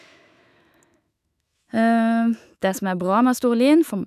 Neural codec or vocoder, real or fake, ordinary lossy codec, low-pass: autoencoder, 48 kHz, 128 numbers a frame, DAC-VAE, trained on Japanese speech; fake; none; 14.4 kHz